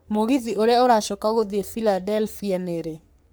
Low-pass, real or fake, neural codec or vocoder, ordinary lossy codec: none; fake; codec, 44.1 kHz, 3.4 kbps, Pupu-Codec; none